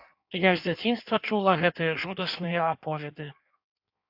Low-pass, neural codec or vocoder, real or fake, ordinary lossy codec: 5.4 kHz; codec, 16 kHz in and 24 kHz out, 1.1 kbps, FireRedTTS-2 codec; fake; AAC, 48 kbps